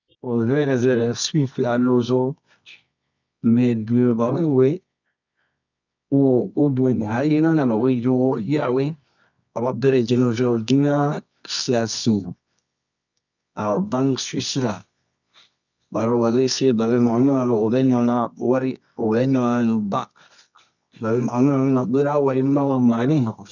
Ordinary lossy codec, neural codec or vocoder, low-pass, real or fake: none; codec, 24 kHz, 0.9 kbps, WavTokenizer, medium music audio release; 7.2 kHz; fake